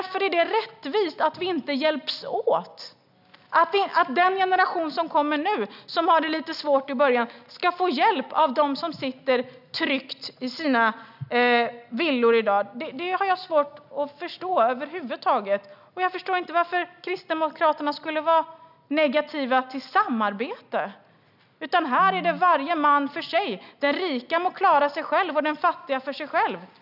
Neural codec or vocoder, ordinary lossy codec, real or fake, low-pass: none; none; real; 5.4 kHz